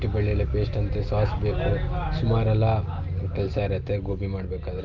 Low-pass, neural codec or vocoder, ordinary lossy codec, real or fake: 7.2 kHz; none; Opus, 24 kbps; real